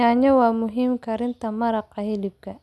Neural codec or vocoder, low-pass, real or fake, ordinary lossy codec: none; none; real; none